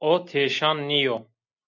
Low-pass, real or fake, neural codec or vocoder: 7.2 kHz; real; none